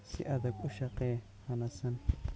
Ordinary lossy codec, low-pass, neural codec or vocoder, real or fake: none; none; none; real